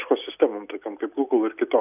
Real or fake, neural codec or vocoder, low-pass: real; none; 3.6 kHz